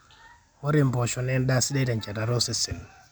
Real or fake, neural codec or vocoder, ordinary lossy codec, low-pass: real; none; none; none